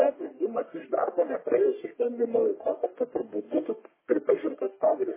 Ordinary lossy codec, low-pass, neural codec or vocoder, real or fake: MP3, 16 kbps; 3.6 kHz; codec, 44.1 kHz, 1.7 kbps, Pupu-Codec; fake